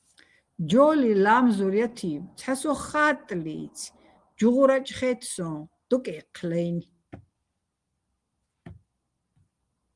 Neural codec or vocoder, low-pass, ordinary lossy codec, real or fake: none; 10.8 kHz; Opus, 24 kbps; real